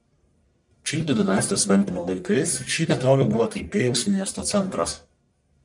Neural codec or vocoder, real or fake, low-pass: codec, 44.1 kHz, 1.7 kbps, Pupu-Codec; fake; 10.8 kHz